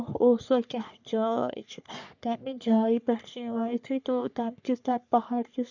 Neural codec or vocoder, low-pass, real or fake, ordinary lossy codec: codec, 44.1 kHz, 3.4 kbps, Pupu-Codec; 7.2 kHz; fake; none